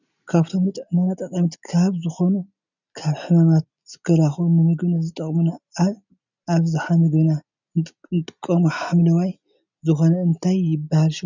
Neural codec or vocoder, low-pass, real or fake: none; 7.2 kHz; real